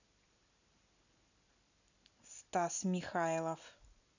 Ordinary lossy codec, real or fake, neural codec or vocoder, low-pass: none; real; none; 7.2 kHz